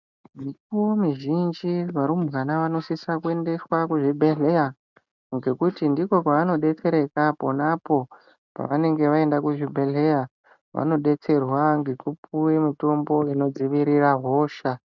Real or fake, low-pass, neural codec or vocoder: real; 7.2 kHz; none